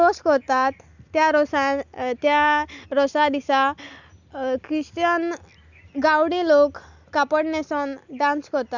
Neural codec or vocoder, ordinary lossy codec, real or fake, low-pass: none; none; real; 7.2 kHz